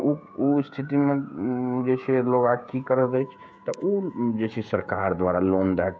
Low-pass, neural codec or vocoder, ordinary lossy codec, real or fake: none; codec, 16 kHz, 16 kbps, FreqCodec, smaller model; none; fake